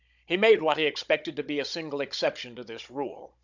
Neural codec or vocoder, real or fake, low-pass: codec, 16 kHz, 16 kbps, FunCodec, trained on Chinese and English, 50 frames a second; fake; 7.2 kHz